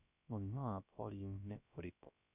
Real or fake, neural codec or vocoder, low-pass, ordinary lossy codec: fake; codec, 16 kHz, 0.3 kbps, FocalCodec; 3.6 kHz; none